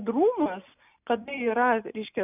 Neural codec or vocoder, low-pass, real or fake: none; 3.6 kHz; real